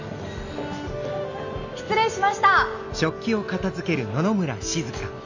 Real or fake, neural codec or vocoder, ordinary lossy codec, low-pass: real; none; none; 7.2 kHz